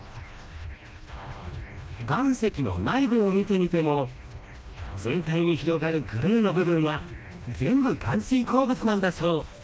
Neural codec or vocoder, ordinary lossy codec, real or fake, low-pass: codec, 16 kHz, 1 kbps, FreqCodec, smaller model; none; fake; none